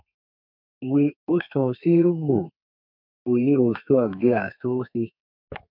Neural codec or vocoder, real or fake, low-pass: codec, 32 kHz, 1.9 kbps, SNAC; fake; 5.4 kHz